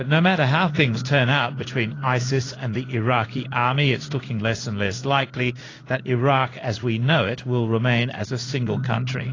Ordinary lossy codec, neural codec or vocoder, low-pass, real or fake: AAC, 32 kbps; codec, 16 kHz in and 24 kHz out, 1 kbps, XY-Tokenizer; 7.2 kHz; fake